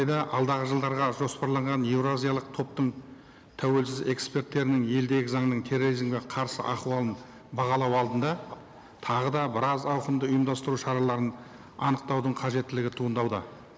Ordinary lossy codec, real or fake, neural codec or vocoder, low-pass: none; real; none; none